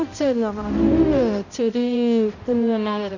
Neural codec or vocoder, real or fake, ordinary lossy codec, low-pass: codec, 16 kHz, 0.5 kbps, X-Codec, HuBERT features, trained on balanced general audio; fake; none; 7.2 kHz